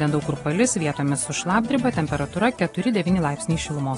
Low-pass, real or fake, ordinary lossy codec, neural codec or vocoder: 10.8 kHz; real; AAC, 32 kbps; none